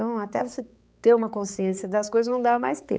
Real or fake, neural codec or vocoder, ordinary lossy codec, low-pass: fake; codec, 16 kHz, 4 kbps, X-Codec, HuBERT features, trained on balanced general audio; none; none